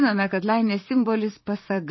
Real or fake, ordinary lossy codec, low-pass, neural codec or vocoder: fake; MP3, 24 kbps; 7.2 kHz; vocoder, 44.1 kHz, 128 mel bands, Pupu-Vocoder